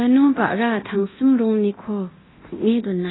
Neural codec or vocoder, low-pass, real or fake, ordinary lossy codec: codec, 24 kHz, 0.9 kbps, DualCodec; 7.2 kHz; fake; AAC, 16 kbps